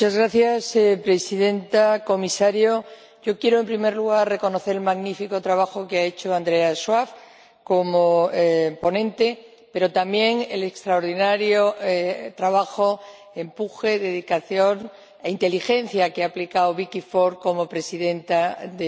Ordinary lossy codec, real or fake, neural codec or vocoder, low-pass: none; real; none; none